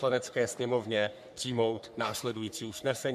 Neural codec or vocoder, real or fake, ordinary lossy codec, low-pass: codec, 44.1 kHz, 3.4 kbps, Pupu-Codec; fake; AAC, 96 kbps; 14.4 kHz